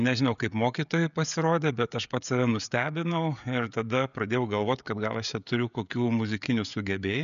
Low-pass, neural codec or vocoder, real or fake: 7.2 kHz; codec, 16 kHz, 16 kbps, FreqCodec, smaller model; fake